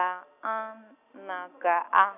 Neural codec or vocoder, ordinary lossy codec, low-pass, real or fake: none; none; 3.6 kHz; real